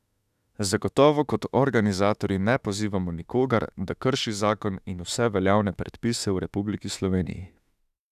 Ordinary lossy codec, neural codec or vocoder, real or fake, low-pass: none; autoencoder, 48 kHz, 32 numbers a frame, DAC-VAE, trained on Japanese speech; fake; 14.4 kHz